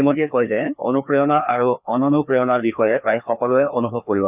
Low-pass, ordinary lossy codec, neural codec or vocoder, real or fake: 3.6 kHz; none; codec, 16 kHz, 2 kbps, FreqCodec, larger model; fake